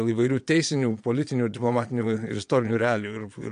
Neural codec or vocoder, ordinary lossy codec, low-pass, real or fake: vocoder, 22.05 kHz, 80 mel bands, Vocos; MP3, 64 kbps; 9.9 kHz; fake